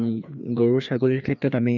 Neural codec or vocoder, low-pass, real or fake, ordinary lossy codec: codec, 16 kHz, 2 kbps, FreqCodec, larger model; 7.2 kHz; fake; none